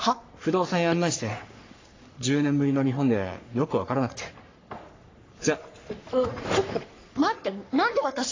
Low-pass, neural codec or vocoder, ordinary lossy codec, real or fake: 7.2 kHz; codec, 44.1 kHz, 3.4 kbps, Pupu-Codec; AAC, 32 kbps; fake